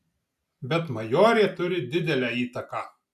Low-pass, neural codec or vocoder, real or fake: 14.4 kHz; none; real